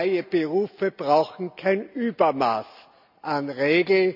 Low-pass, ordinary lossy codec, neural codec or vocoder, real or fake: 5.4 kHz; none; none; real